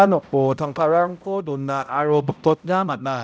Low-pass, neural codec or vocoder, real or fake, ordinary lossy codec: none; codec, 16 kHz, 0.5 kbps, X-Codec, HuBERT features, trained on balanced general audio; fake; none